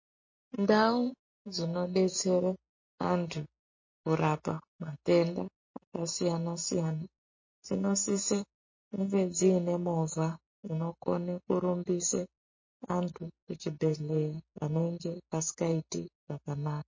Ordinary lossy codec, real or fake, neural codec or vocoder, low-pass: MP3, 32 kbps; real; none; 7.2 kHz